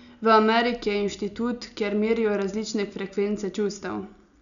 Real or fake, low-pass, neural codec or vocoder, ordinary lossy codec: real; 7.2 kHz; none; none